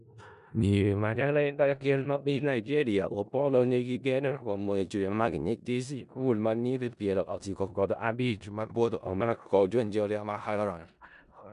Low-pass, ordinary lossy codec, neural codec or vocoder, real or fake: 10.8 kHz; none; codec, 16 kHz in and 24 kHz out, 0.4 kbps, LongCat-Audio-Codec, four codebook decoder; fake